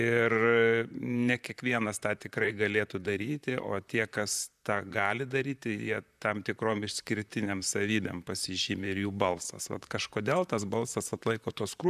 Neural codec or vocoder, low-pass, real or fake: vocoder, 44.1 kHz, 128 mel bands, Pupu-Vocoder; 14.4 kHz; fake